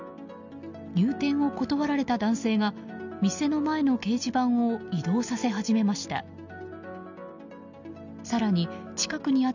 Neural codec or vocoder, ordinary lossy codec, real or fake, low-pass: none; none; real; 7.2 kHz